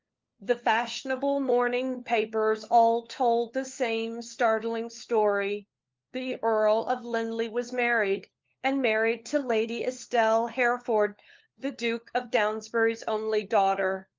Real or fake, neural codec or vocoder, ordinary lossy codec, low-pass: fake; codec, 16 kHz, 4 kbps, FunCodec, trained on LibriTTS, 50 frames a second; Opus, 24 kbps; 7.2 kHz